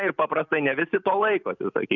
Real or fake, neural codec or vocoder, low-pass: real; none; 7.2 kHz